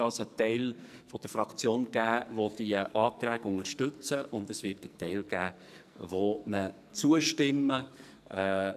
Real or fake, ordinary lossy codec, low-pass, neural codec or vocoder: fake; none; 14.4 kHz; codec, 44.1 kHz, 2.6 kbps, SNAC